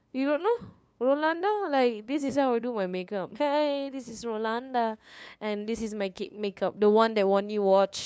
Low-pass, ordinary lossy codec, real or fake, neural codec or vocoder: none; none; fake; codec, 16 kHz, 2 kbps, FunCodec, trained on LibriTTS, 25 frames a second